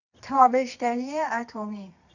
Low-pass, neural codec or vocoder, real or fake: 7.2 kHz; codec, 24 kHz, 0.9 kbps, WavTokenizer, medium music audio release; fake